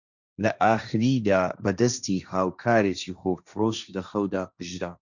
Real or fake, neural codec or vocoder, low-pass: fake; codec, 16 kHz, 1.1 kbps, Voila-Tokenizer; 7.2 kHz